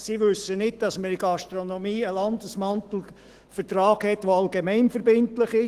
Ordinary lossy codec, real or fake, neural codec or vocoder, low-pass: Opus, 16 kbps; fake; autoencoder, 48 kHz, 128 numbers a frame, DAC-VAE, trained on Japanese speech; 14.4 kHz